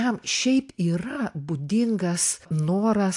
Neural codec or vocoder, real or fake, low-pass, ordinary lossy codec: none; real; 10.8 kHz; AAC, 64 kbps